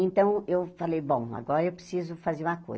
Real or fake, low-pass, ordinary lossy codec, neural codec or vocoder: real; none; none; none